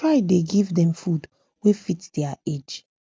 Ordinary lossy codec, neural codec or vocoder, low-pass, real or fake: none; none; none; real